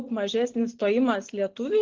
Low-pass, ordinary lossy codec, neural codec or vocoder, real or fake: 7.2 kHz; Opus, 16 kbps; none; real